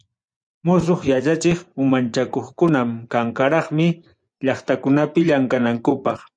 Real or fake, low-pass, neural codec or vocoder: fake; 9.9 kHz; vocoder, 24 kHz, 100 mel bands, Vocos